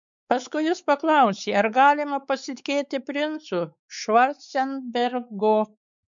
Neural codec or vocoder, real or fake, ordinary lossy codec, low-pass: codec, 16 kHz, 4 kbps, X-Codec, WavLM features, trained on Multilingual LibriSpeech; fake; MP3, 96 kbps; 7.2 kHz